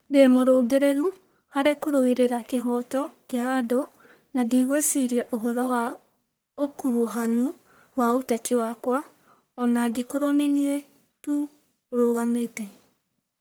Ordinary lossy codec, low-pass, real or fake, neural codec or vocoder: none; none; fake; codec, 44.1 kHz, 1.7 kbps, Pupu-Codec